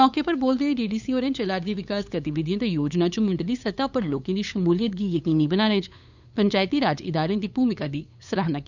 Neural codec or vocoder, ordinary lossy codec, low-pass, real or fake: codec, 16 kHz, 8 kbps, FunCodec, trained on LibriTTS, 25 frames a second; none; 7.2 kHz; fake